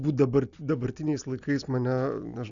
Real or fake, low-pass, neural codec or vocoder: real; 7.2 kHz; none